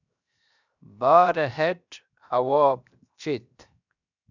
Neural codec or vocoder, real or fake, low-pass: codec, 16 kHz, 0.7 kbps, FocalCodec; fake; 7.2 kHz